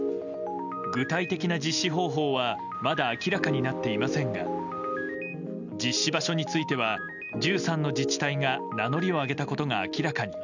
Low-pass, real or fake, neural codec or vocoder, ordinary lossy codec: 7.2 kHz; real; none; none